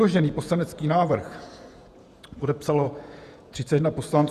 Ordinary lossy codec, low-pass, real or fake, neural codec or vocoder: Opus, 64 kbps; 14.4 kHz; fake; vocoder, 48 kHz, 128 mel bands, Vocos